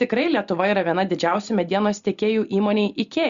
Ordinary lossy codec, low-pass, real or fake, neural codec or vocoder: Opus, 64 kbps; 7.2 kHz; real; none